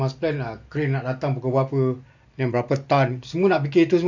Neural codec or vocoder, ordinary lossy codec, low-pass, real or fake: none; none; 7.2 kHz; real